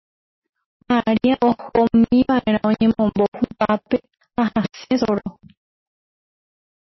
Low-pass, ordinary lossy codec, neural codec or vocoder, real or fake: 7.2 kHz; MP3, 24 kbps; none; real